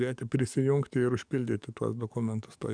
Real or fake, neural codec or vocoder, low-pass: fake; codec, 44.1 kHz, 7.8 kbps, DAC; 9.9 kHz